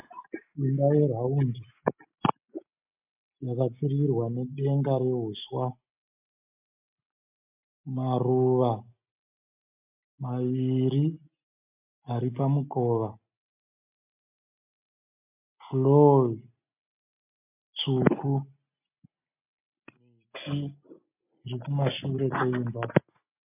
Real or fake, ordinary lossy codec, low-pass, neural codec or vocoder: real; MP3, 32 kbps; 3.6 kHz; none